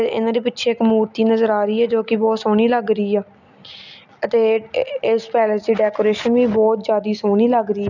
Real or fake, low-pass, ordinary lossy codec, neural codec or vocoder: real; 7.2 kHz; none; none